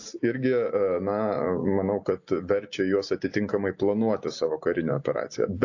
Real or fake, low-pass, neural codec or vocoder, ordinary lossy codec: real; 7.2 kHz; none; AAC, 48 kbps